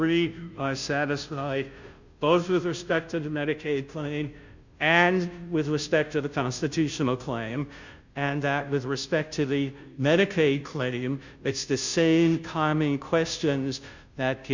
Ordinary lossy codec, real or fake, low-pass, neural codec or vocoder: Opus, 64 kbps; fake; 7.2 kHz; codec, 16 kHz, 0.5 kbps, FunCodec, trained on Chinese and English, 25 frames a second